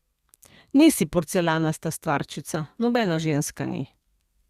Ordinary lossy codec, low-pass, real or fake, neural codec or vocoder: Opus, 64 kbps; 14.4 kHz; fake; codec, 32 kHz, 1.9 kbps, SNAC